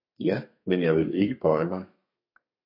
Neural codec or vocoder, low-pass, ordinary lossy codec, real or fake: codec, 32 kHz, 1.9 kbps, SNAC; 5.4 kHz; MP3, 32 kbps; fake